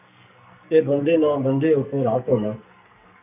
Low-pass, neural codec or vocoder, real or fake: 3.6 kHz; codec, 44.1 kHz, 2.6 kbps, SNAC; fake